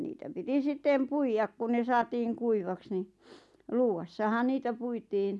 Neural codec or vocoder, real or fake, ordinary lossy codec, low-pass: none; real; none; none